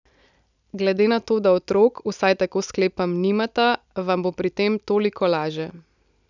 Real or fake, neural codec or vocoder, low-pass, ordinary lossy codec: real; none; 7.2 kHz; none